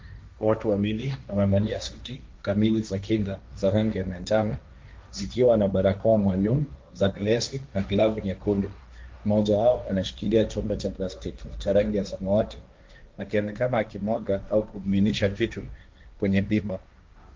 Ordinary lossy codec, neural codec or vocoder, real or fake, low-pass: Opus, 32 kbps; codec, 16 kHz, 1.1 kbps, Voila-Tokenizer; fake; 7.2 kHz